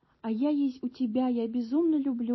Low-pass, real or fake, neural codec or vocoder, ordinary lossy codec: 7.2 kHz; real; none; MP3, 24 kbps